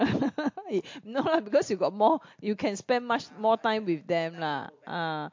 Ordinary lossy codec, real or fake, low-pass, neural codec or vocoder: MP3, 48 kbps; real; 7.2 kHz; none